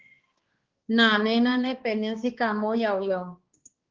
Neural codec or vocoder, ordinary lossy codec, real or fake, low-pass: codec, 16 kHz, 2 kbps, X-Codec, HuBERT features, trained on balanced general audio; Opus, 16 kbps; fake; 7.2 kHz